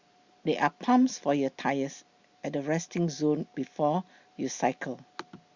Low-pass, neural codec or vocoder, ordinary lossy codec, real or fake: 7.2 kHz; none; Opus, 64 kbps; real